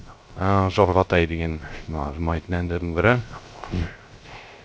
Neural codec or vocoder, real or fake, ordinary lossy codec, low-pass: codec, 16 kHz, 0.3 kbps, FocalCodec; fake; none; none